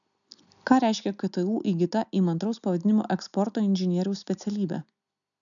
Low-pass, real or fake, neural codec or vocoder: 7.2 kHz; real; none